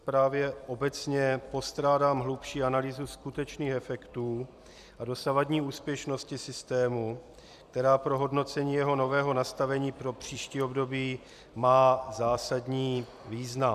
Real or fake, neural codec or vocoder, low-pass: fake; vocoder, 44.1 kHz, 128 mel bands every 256 samples, BigVGAN v2; 14.4 kHz